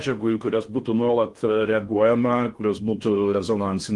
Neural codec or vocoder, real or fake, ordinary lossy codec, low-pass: codec, 16 kHz in and 24 kHz out, 0.6 kbps, FocalCodec, streaming, 4096 codes; fake; Opus, 24 kbps; 10.8 kHz